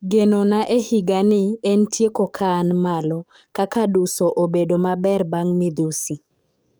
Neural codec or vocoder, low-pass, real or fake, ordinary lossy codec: codec, 44.1 kHz, 7.8 kbps, DAC; none; fake; none